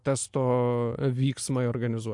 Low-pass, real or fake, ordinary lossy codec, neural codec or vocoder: 10.8 kHz; real; MP3, 64 kbps; none